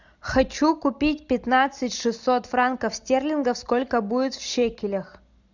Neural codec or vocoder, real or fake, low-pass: none; real; 7.2 kHz